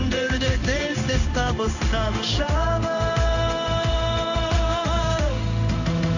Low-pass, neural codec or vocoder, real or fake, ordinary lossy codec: 7.2 kHz; codec, 16 kHz in and 24 kHz out, 1 kbps, XY-Tokenizer; fake; none